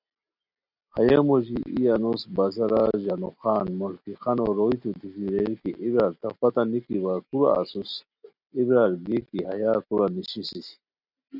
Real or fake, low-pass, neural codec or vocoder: real; 5.4 kHz; none